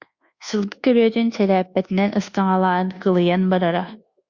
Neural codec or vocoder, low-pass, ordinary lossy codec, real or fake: codec, 24 kHz, 1.2 kbps, DualCodec; 7.2 kHz; Opus, 64 kbps; fake